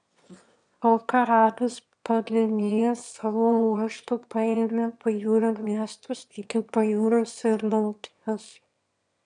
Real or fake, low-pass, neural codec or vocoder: fake; 9.9 kHz; autoencoder, 22.05 kHz, a latent of 192 numbers a frame, VITS, trained on one speaker